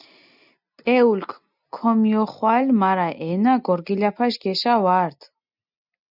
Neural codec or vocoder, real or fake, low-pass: none; real; 5.4 kHz